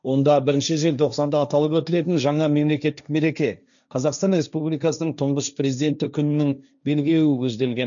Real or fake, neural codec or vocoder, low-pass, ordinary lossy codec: fake; codec, 16 kHz, 1.1 kbps, Voila-Tokenizer; 7.2 kHz; none